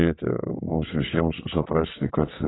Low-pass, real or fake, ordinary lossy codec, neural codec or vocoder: 7.2 kHz; fake; AAC, 16 kbps; vocoder, 22.05 kHz, 80 mel bands, WaveNeXt